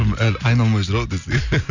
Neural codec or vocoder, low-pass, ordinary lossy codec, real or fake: none; 7.2 kHz; none; real